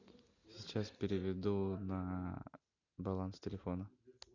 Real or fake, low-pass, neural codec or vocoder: real; 7.2 kHz; none